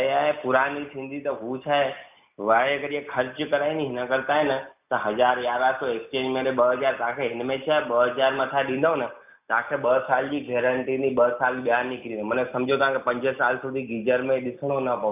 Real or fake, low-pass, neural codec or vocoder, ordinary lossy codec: real; 3.6 kHz; none; none